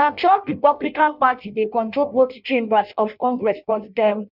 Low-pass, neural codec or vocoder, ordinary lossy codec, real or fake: 5.4 kHz; codec, 16 kHz in and 24 kHz out, 0.6 kbps, FireRedTTS-2 codec; none; fake